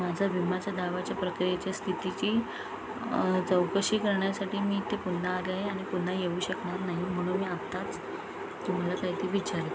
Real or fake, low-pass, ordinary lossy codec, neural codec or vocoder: real; none; none; none